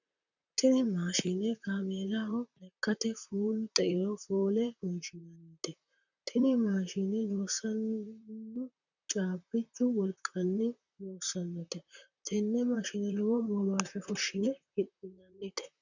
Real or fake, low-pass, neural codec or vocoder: fake; 7.2 kHz; vocoder, 44.1 kHz, 128 mel bands, Pupu-Vocoder